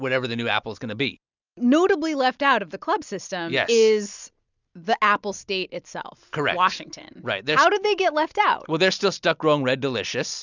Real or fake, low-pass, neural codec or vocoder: real; 7.2 kHz; none